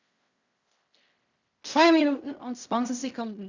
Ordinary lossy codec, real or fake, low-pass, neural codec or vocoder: Opus, 64 kbps; fake; 7.2 kHz; codec, 16 kHz in and 24 kHz out, 0.4 kbps, LongCat-Audio-Codec, fine tuned four codebook decoder